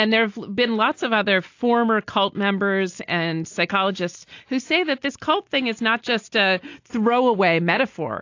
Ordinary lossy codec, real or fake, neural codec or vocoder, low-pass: AAC, 48 kbps; real; none; 7.2 kHz